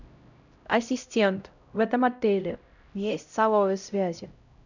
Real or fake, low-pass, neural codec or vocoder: fake; 7.2 kHz; codec, 16 kHz, 0.5 kbps, X-Codec, HuBERT features, trained on LibriSpeech